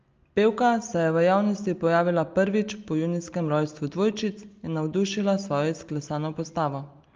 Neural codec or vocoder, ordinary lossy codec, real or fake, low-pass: none; Opus, 24 kbps; real; 7.2 kHz